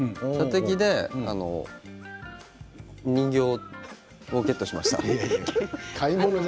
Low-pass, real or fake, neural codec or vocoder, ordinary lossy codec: none; real; none; none